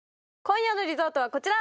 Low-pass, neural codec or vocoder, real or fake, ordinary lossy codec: none; none; real; none